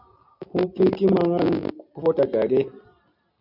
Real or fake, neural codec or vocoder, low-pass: real; none; 5.4 kHz